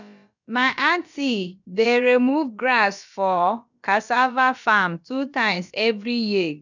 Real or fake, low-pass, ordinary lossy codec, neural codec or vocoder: fake; 7.2 kHz; none; codec, 16 kHz, about 1 kbps, DyCAST, with the encoder's durations